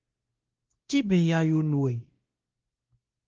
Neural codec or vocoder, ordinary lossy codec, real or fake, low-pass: codec, 16 kHz, 1 kbps, X-Codec, WavLM features, trained on Multilingual LibriSpeech; Opus, 16 kbps; fake; 7.2 kHz